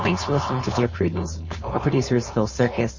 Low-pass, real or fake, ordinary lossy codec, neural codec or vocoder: 7.2 kHz; fake; MP3, 32 kbps; codec, 16 kHz, 4.8 kbps, FACodec